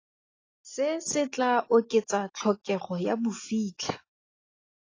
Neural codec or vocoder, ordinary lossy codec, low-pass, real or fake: none; AAC, 32 kbps; 7.2 kHz; real